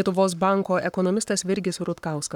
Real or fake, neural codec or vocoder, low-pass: fake; codec, 44.1 kHz, 7.8 kbps, Pupu-Codec; 19.8 kHz